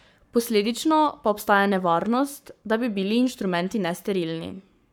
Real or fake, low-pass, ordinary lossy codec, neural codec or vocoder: fake; none; none; codec, 44.1 kHz, 7.8 kbps, Pupu-Codec